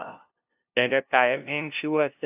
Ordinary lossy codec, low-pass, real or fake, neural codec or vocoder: AAC, 32 kbps; 3.6 kHz; fake; codec, 16 kHz, 0.5 kbps, FunCodec, trained on LibriTTS, 25 frames a second